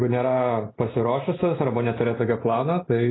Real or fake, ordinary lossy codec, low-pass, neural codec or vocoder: real; AAC, 16 kbps; 7.2 kHz; none